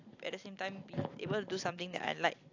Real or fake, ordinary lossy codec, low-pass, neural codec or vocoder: real; AAC, 48 kbps; 7.2 kHz; none